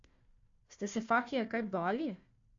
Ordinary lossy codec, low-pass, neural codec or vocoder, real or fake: AAC, 48 kbps; 7.2 kHz; codec, 16 kHz, 1 kbps, FunCodec, trained on Chinese and English, 50 frames a second; fake